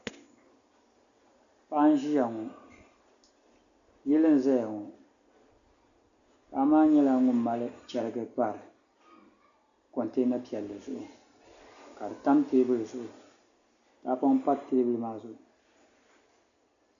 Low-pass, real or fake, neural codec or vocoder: 7.2 kHz; real; none